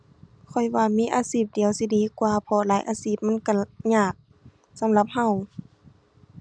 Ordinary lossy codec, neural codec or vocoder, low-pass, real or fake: none; none; none; real